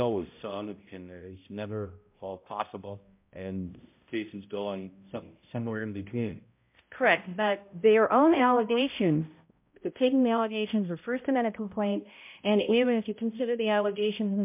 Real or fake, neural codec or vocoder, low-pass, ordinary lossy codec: fake; codec, 16 kHz, 0.5 kbps, X-Codec, HuBERT features, trained on balanced general audio; 3.6 kHz; MP3, 32 kbps